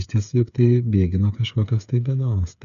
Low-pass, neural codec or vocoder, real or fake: 7.2 kHz; codec, 16 kHz, 8 kbps, FreqCodec, smaller model; fake